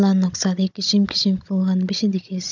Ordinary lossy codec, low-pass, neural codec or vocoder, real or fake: none; 7.2 kHz; codec, 16 kHz, 16 kbps, FunCodec, trained on Chinese and English, 50 frames a second; fake